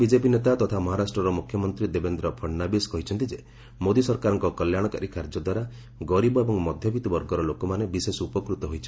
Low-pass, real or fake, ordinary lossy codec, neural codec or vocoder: none; real; none; none